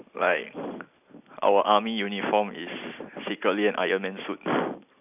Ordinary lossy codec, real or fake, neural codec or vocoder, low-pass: none; real; none; 3.6 kHz